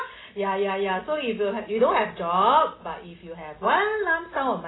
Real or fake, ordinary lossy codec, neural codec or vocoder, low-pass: real; AAC, 16 kbps; none; 7.2 kHz